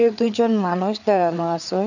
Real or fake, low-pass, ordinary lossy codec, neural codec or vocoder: fake; 7.2 kHz; none; codec, 16 kHz, 4 kbps, FreqCodec, larger model